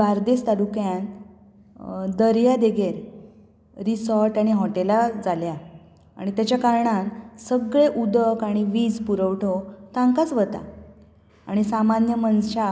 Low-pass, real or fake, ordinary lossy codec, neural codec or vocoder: none; real; none; none